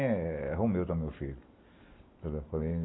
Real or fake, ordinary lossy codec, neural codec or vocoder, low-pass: real; AAC, 16 kbps; none; 7.2 kHz